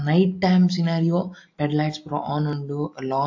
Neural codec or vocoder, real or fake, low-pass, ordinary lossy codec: none; real; 7.2 kHz; AAC, 48 kbps